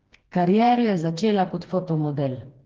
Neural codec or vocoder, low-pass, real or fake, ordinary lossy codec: codec, 16 kHz, 2 kbps, FreqCodec, smaller model; 7.2 kHz; fake; Opus, 16 kbps